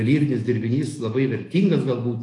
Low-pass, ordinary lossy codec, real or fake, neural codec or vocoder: 10.8 kHz; AAC, 48 kbps; fake; autoencoder, 48 kHz, 128 numbers a frame, DAC-VAE, trained on Japanese speech